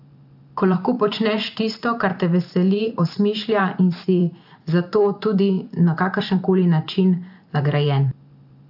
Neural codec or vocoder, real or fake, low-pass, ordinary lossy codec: vocoder, 44.1 kHz, 128 mel bands every 512 samples, BigVGAN v2; fake; 5.4 kHz; AAC, 48 kbps